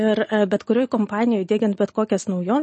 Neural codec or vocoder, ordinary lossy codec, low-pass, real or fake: none; MP3, 32 kbps; 10.8 kHz; real